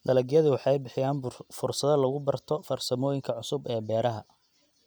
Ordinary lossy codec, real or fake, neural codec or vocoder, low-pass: none; real; none; none